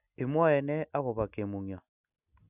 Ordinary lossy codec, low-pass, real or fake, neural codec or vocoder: none; 3.6 kHz; real; none